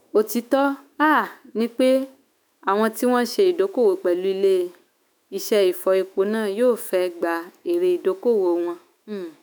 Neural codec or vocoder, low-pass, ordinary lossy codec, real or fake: autoencoder, 48 kHz, 128 numbers a frame, DAC-VAE, trained on Japanese speech; none; none; fake